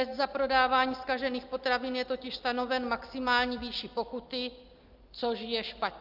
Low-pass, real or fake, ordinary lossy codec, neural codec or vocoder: 5.4 kHz; real; Opus, 32 kbps; none